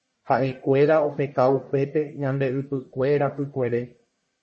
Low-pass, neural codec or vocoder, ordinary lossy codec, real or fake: 10.8 kHz; codec, 44.1 kHz, 1.7 kbps, Pupu-Codec; MP3, 32 kbps; fake